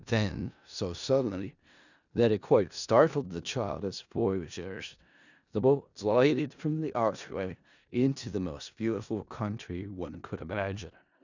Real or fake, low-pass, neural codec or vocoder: fake; 7.2 kHz; codec, 16 kHz in and 24 kHz out, 0.4 kbps, LongCat-Audio-Codec, four codebook decoder